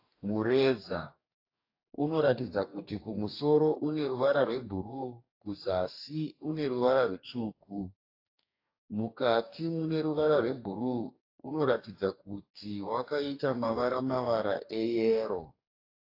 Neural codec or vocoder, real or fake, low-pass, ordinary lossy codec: codec, 44.1 kHz, 2.6 kbps, DAC; fake; 5.4 kHz; AAC, 32 kbps